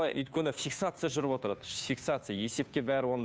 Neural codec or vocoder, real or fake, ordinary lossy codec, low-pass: codec, 16 kHz, 2 kbps, FunCodec, trained on Chinese and English, 25 frames a second; fake; none; none